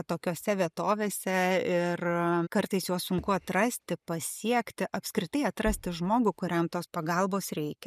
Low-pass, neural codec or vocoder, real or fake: 14.4 kHz; none; real